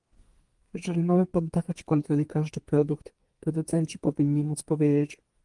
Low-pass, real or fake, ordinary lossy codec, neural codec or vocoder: 10.8 kHz; fake; Opus, 24 kbps; codec, 32 kHz, 1.9 kbps, SNAC